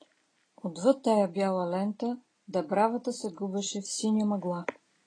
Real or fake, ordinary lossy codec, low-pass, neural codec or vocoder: real; AAC, 32 kbps; 9.9 kHz; none